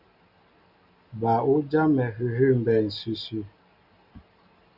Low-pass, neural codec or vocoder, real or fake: 5.4 kHz; none; real